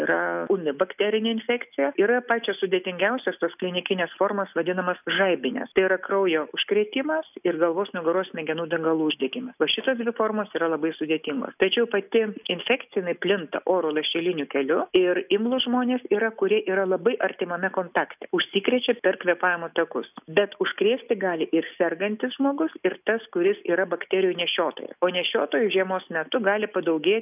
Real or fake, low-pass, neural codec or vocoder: real; 3.6 kHz; none